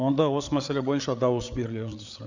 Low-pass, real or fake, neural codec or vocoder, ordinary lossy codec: 7.2 kHz; fake; codec, 16 kHz, 8 kbps, FreqCodec, larger model; Opus, 64 kbps